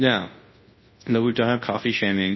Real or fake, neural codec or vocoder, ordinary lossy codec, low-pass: fake; codec, 24 kHz, 0.9 kbps, WavTokenizer, large speech release; MP3, 24 kbps; 7.2 kHz